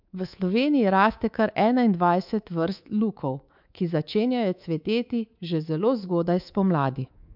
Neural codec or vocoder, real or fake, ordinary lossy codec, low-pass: codec, 24 kHz, 3.1 kbps, DualCodec; fake; MP3, 48 kbps; 5.4 kHz